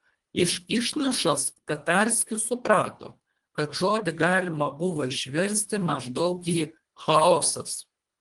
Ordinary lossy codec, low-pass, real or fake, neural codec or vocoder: Opus, 32 kbps; 10.8 kHz; fake; codec, 24 kHz, 1.5 kbps, HILCodec